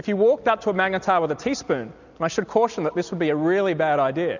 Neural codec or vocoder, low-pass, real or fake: none; 7.2 kHz; real